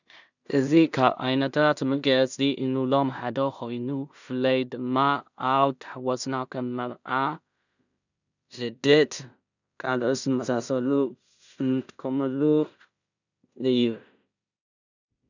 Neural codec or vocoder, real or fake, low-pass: codec, 16 kHz in and 24 kHz out, 0.4 kbps, LongCat-Audio-Codec, two codebook decoder; fake; 7.2 kHz